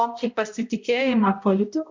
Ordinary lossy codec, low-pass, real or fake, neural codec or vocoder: MP3, 64 kbps; 7.2 kHz; fake; codec, 16 kHz, 0.5 kbps, X-Codec, HuBERT features, trained on balanced general audio